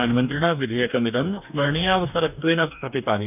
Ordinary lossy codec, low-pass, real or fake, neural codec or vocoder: none; 3.6 kHz; fake; codec, 44.1 kHz, 2.6 kbps, DAC